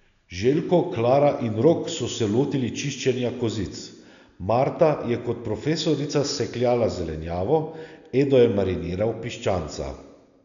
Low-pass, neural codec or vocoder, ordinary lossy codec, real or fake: 7.2 kHz; none; none; real